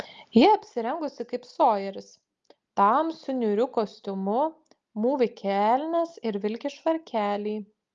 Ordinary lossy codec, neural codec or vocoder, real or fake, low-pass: Opus, 32 kbps; none; real; 7.2 kHz